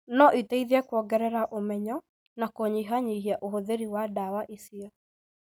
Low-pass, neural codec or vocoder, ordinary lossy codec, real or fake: none; none; none; real